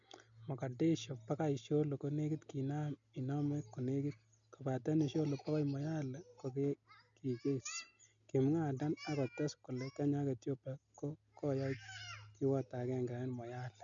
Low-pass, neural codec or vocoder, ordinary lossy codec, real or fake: 7.2 kHz; none; none; real